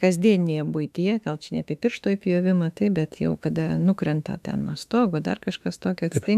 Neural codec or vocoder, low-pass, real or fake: autoencoder, 48 kHz, 32 numbers a frame, DAC-VAE, trained on Japanese speech; 14.4 kHz; fake